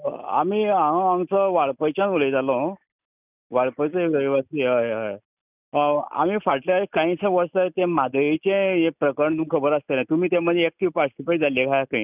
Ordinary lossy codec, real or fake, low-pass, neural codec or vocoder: none; real; 3.6 kHz; none